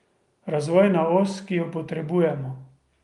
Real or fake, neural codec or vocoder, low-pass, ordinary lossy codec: real; none; 10.8 kHz; Opus, 32 kbps